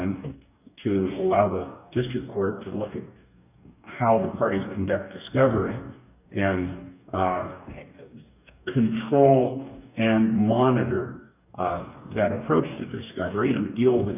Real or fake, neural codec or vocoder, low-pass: fake; codec, 44.1 kHz, 2.6 kbps, DAC; 3.6 kHz